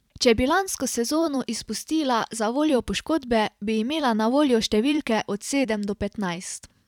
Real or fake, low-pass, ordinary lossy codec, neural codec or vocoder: fake; 19.8 kHz; none; vocoder, 44.1 kHz, 128 mel bands every 512 samples, BigVGAN v2